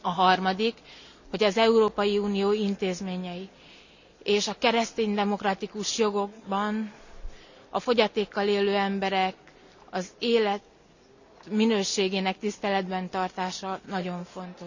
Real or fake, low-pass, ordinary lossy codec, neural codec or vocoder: real; 7.2 kHz; none; none